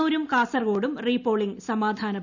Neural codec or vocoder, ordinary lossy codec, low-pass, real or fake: none; none; 7.2 kHz; real